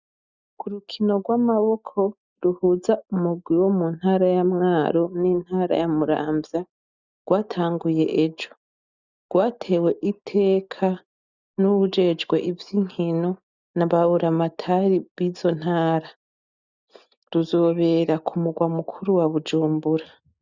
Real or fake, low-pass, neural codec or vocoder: real; 7.2 kHz; none